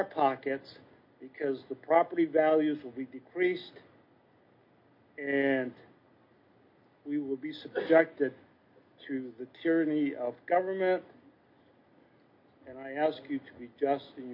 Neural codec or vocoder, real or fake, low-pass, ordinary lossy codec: none; real; 5.4 kHz; MP3, 32 kbps